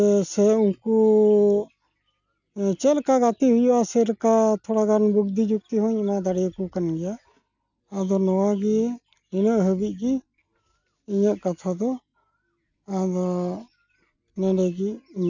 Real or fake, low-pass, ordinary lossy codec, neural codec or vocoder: real; 7.2 kHz; none; none